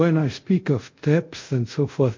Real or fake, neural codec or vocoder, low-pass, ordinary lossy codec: fake; codec, 24 kHz, 0.5 kbps, DualCodec; 7.2 kHz; MP3, 48 kbps